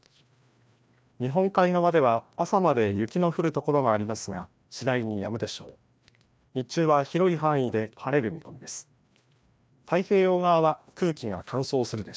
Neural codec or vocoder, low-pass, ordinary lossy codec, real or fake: codec, 16 kHz, 1 kbps, FreqCodec, larger model; none; none; fake